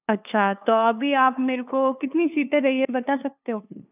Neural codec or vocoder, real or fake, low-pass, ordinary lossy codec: codec, 16 kHz, 2 kbps, FunCodec, trained on LibriTTS, 25 frames a second; fake; 3.6 kHz; none